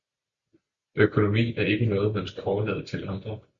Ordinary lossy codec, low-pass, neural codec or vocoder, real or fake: Opus, 64 kbps; 7.2 kHz; none; real